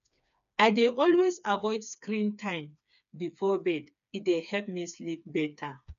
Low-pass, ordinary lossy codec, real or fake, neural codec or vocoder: 7.2 kHz; none; fake; codec, 16 kHz, 4 kbps, FreqCodec, smaller model